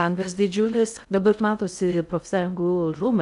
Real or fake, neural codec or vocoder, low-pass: fake; codec, 16 kHz in and 24 kHz out, 0.6 kbps, FocalCodec, streaming, 2048 codes; 10.8 kHz